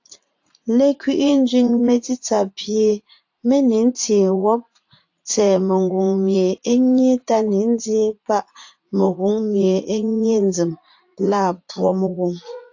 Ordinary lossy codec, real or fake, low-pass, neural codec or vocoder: AAC, 48 kbps; fake; 7.2 kHz; vocoder, 44.1 kHz, 80 mel bands, Vocos